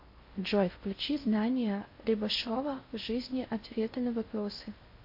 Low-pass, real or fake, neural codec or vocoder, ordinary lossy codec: 5.4 kHz; fake; codec, 16 kHz in and 24 kHz out, 0.6 kbps, FocalCodec, streaming, 4096 codes; MP3, 32 kbps